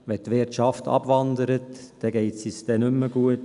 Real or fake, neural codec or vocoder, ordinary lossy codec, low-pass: real; none; none; 10.8 kHz